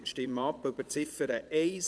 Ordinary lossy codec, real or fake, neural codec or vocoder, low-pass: none; fake; vocoder, 22.05 kHz, 80 mel bands, Vocos; none